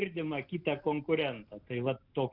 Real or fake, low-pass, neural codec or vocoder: real; 5.4 kHz; none